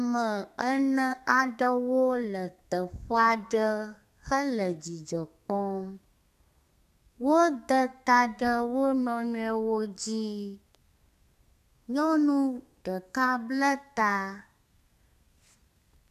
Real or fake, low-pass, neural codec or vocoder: fake; 14.4 kHz; codec, 32 kHz, 1.9 kbps, SNAC